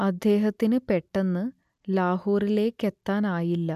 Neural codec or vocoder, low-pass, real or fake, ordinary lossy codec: none; 14.4 kHz; real; none